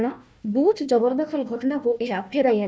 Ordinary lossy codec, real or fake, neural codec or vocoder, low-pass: none; fake; codec, 16 kHz, 1 kbps, FunCodec, trained on Chinese and English, 50 frames a second; none